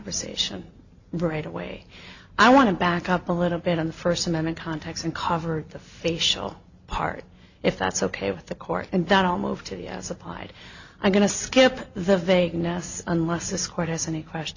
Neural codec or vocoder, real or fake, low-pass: none; real; 7.2 kHz